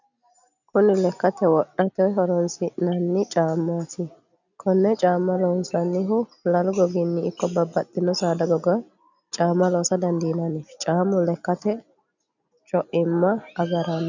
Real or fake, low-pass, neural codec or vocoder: real; 7.2 kHz; none